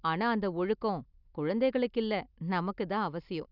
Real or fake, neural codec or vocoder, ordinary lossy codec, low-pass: real; none; none; 5.4 kHz